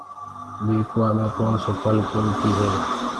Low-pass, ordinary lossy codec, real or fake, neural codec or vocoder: 10.8 kHz; Opus, 16 kbps; real; none